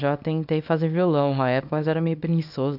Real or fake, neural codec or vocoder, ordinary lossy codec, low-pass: fake; codec, 24 kHz, 0.9 kbps, WavTokenizer, small release; none; 5.4 kHz